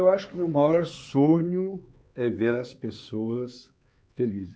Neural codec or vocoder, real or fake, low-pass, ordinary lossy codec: codec, 16 kHz, 4 kbps, X-Codec, HuBERT features, trained on LibriSpeech; fake; none; none